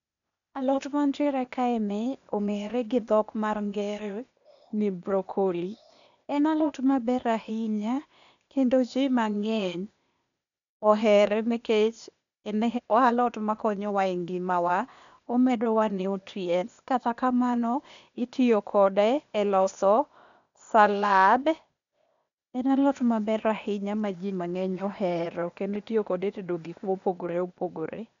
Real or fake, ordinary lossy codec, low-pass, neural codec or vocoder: fake; none; 7.2 kHz; codec, 16 kHz, 0.8 kbps, ZipCodec